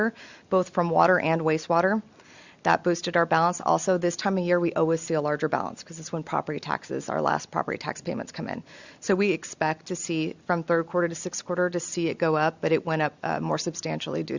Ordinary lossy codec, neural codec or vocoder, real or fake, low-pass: Opus, 64 kbps; none; real; 7.2 kHz